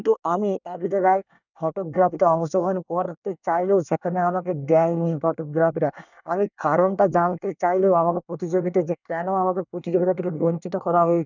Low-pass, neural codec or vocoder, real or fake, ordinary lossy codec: 7.2 kHz; codec, 24 kHz, 1 kbps, SNAC; fake; none